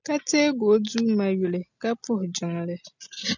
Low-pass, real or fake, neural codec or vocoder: 7.2 kHz; real; none